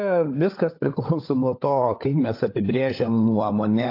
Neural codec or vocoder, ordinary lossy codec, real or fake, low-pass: codec, 16 kHz, 16 kbps, FunCodec, trained on LibriTTS, 50 frames a second; AAC, 32 kbps; fake; 5.4 kHz